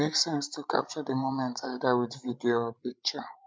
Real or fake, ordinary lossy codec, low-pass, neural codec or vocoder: fake; none; none; codec, 16 kHz, 16 kbps, FreqCodec, larger model